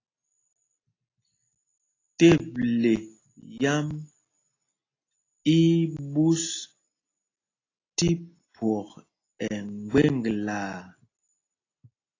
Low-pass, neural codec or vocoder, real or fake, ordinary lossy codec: 7.2 kHz; none; real; AAC, 32 kbps